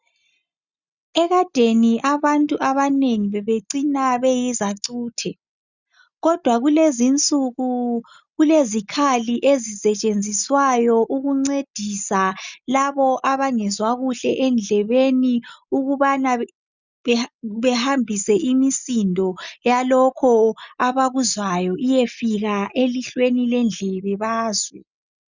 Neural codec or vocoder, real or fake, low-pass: none; real; 7.2 kHz